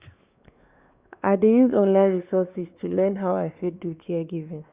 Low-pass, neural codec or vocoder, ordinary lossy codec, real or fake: 3.6 kHz; codec, 16 kHz, 2 kbps, X-Codec, WavLM features, trained on Multilingual LibriSpeech; Opus, 64 kbps; fake